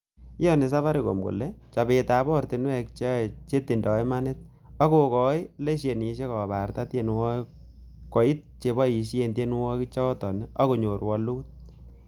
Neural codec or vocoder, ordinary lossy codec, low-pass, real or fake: none; Opus, 32 kbps; 19.8 kHz; real